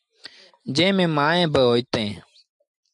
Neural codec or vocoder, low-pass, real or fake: none; 10.8 kHz; real